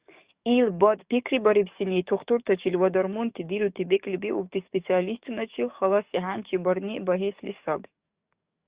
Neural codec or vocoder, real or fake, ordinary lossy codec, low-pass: codec, 44.1 kHz, 7.8 kbps, DAC; fake; Opus, 64 kbps; 3.6 kHz